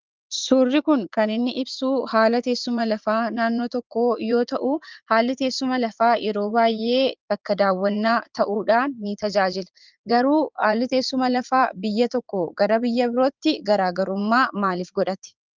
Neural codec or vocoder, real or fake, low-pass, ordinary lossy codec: vocoder, 44.1 kHz, 80 mel bands, Vocos; fake; 7.2 kHz; Opus, 24 kbps